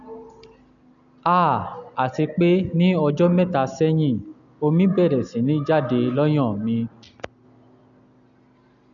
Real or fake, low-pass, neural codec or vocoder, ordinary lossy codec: real; 7.2 kHz; none; none